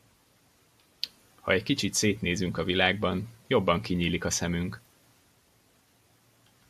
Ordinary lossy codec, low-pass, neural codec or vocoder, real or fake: AAC, 96 kbps; 14.4 kHz; none; real